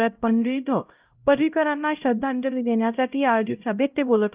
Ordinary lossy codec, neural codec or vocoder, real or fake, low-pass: Opus, 32 kbps; codec, 16 kHz, 0.5 kbps, X-Codec, HuBERT features, trained on LibriSpeech; fake; 3.6 kHz